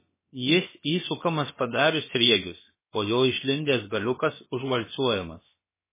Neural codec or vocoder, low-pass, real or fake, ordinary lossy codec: codec, 16 kHz, about 1 kbps, DyCAST, with the encoder's durations; 3.6 kHz; fake; MP3, 16 kbps